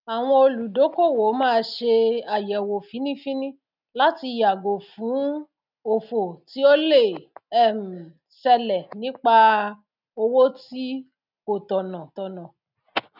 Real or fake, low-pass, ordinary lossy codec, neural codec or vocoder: real; 5.4 kHz; none; none